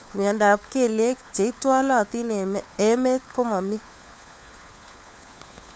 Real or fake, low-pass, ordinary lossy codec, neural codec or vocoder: fake; none; none; codec, 16 kHz, 4 kbps, FunCodec, trained on LibriTTS, 50 frames a second